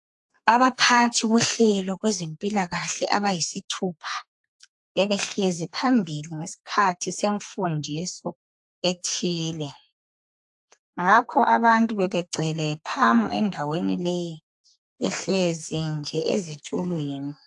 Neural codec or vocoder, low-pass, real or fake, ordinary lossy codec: codec, 32 kHz, 1.9 kbps, SNAC; 10.8 kHz; fake; AAC, 64 kbps